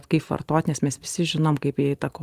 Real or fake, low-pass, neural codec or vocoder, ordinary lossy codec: real; 14.4 kHz; none; Opus, 32 kbps